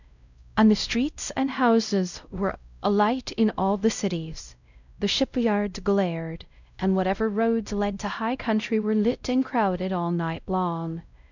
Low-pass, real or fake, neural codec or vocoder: 7.2 kHz; fake; codec, 16 kHz, 0.5 kbps, X-Codec, WavLM features, trained on Multilingual LibriSpeech